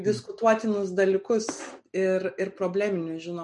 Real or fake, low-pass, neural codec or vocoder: real; 10.8 kHz; none